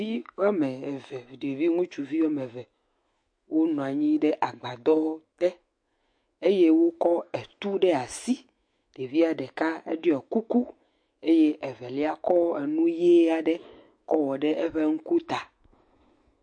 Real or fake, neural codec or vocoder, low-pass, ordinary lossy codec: fake; autoencoder, 48 kHz, 128 numbers a frame, DAC-VAE, trained on Japanese speech; 9.9 kHz; MP3, 48 kbps